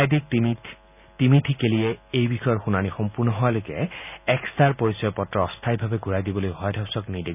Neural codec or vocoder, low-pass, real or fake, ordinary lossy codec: none; 3.6 kHz; real; none